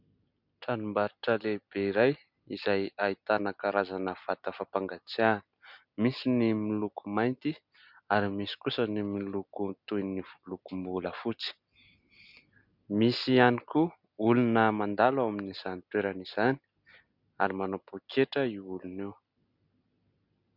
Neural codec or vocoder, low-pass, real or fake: none; 5.4 kHz; real